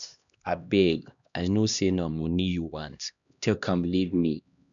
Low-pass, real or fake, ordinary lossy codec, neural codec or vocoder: 7.2 kHz; fake; none; codec, 16 kHz, 1 kbps, X-Codec, HuBERT features, trained on LibriSpeech